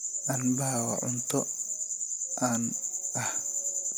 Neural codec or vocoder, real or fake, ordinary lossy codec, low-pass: none; real; none; none